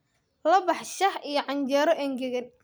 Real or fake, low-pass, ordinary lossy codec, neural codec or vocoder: real; none; none; none